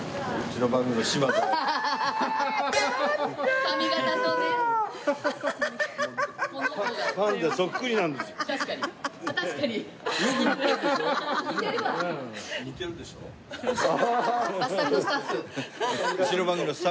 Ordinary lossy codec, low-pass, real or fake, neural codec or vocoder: none; none; real; none